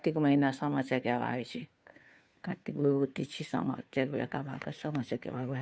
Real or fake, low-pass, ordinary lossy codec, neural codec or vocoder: fake; none; none; codec, 16 kHz, 2 kbps, FunCodec, trained on Chinese and English, 25 frames a second